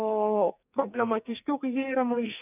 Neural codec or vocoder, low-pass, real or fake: codec, 44.1 kHz, 2.6 kbps, SNAC; 3.6 kHz; fake